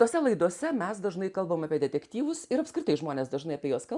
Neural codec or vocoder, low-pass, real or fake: none; 10.8 kHz; real